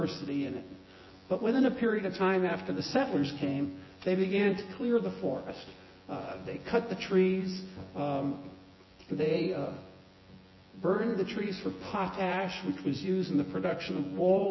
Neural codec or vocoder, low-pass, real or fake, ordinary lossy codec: vocoder, 24 kHz, 100 mel bands, Vocos; 7.2 kHz; fake; MP3, 24 kbps